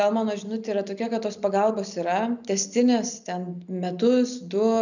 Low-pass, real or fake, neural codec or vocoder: 7.2 kHz; real; none